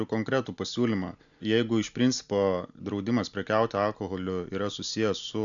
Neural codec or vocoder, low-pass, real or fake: none; 7.2 kHz; real